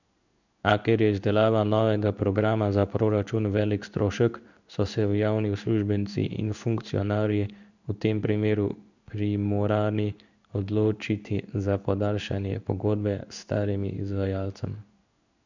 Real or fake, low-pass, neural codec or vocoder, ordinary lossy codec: fake; 7.2 kHz; codec, 16 kHz in and 24 kHz out, 1 kbps, XY-Tokenizer; none